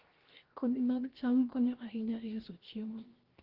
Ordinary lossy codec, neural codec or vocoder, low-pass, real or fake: Opus, 16 kbps; codec, 16 kHz, 0.7 kbps, FocalCodec; 5.4 kHz; fake